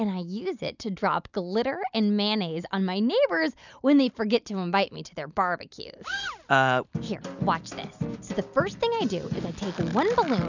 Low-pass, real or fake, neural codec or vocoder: 7.2 kHz; real; none